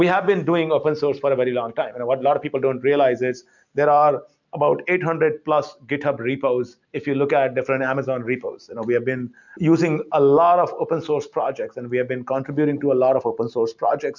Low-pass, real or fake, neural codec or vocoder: 7.2 kHz; real; none